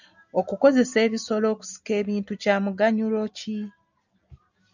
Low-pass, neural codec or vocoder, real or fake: 7.2 kHz; none; real